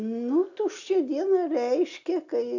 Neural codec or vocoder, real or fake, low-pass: none; real; 7.2 kHz